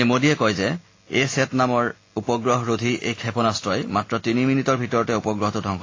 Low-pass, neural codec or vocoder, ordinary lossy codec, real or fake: 7.2 kHz; none; AAC, 32 kbps; real